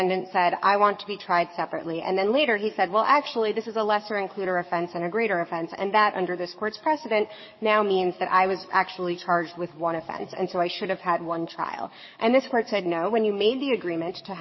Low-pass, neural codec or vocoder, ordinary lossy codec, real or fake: 7.2 kHz; codec, 44.1 kHz, 7.8 kbps, DAC; MP3, 24 kbps; fake